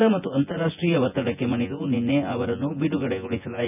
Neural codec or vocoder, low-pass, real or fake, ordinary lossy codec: vocoder, 24 kHz, 100 mel bands, Vocos; 3.6 kHz; fake; none